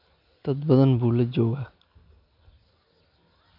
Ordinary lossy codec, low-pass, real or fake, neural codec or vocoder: none; 5.4 kHz; real; none